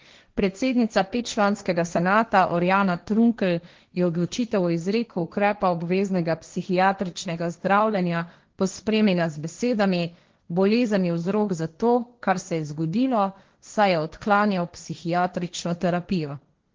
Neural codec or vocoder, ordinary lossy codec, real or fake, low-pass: codec, 16 kHz, 1.1 kbps, Voila-Tokenizer; Opus, 16 kbps; fake; 7.2 kHz